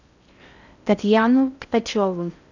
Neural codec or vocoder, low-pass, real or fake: codec, 16 kHz in and 24 kHz out, 0.6 kbps, FocalCodec, streaming, 4096 codes; 7.2 kHz; fake